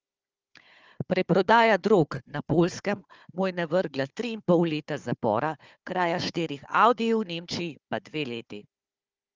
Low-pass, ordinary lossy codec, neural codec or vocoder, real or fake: 7.2 kHz; Opus, 24 kbps; codec, 16 kHz, 4 kbps, FunCodec, trained on Chinese and English, 50 frames a second; fake